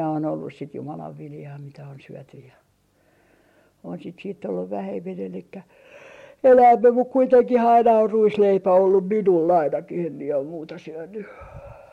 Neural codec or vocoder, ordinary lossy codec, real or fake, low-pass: none; MP3, 64 kbps; real; 19.8 kHz